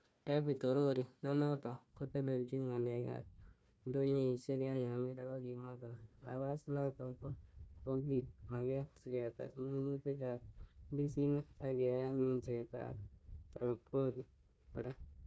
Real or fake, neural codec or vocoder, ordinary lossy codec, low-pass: fake; codec, 16 kHz, 1 kbps, FunCodec, trained on Chinese and English, 50 frames a second; none; none